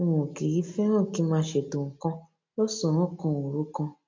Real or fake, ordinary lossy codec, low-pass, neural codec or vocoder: real; MP3, 64 kbps; 7.2 kHz; none